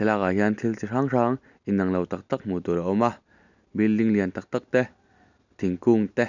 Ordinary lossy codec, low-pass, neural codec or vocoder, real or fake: none; 7.2 kHz; none; real